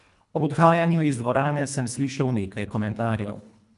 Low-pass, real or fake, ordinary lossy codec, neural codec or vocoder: 10.8 kHz; fake; none; codec, 24 kHz, 1.5 kbps, HILCodec